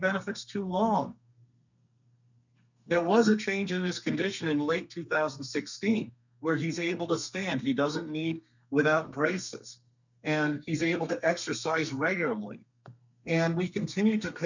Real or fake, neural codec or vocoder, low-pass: fake; codec, 32 kHz, 1.9 kbps, SNAC; 7.2 kHz